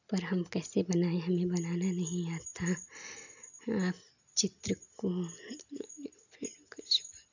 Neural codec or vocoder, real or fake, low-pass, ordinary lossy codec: none; real; 7.2 kHz; none